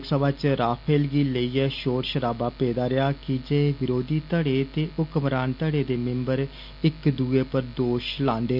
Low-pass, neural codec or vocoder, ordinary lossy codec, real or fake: 5.4 kHz; none; none; real